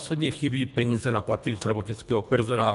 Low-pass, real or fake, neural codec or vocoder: 10.8 kHz; fake; codec, 24 kHz, 1.5 kbps, HILCodec